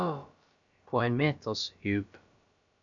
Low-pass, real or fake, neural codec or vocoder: 7.2 kHz; fake; codec, 16 kHz, about 1 kbps, DyCAST, with the encoder's durations